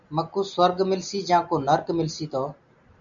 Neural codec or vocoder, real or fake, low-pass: none; real; 7.2 kHz